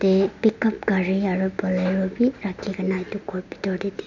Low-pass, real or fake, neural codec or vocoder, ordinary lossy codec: 7.2 kHz; real; none; none